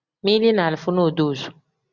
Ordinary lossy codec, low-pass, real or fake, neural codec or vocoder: Opus, 64 kbps; 7.2 kHz; real; none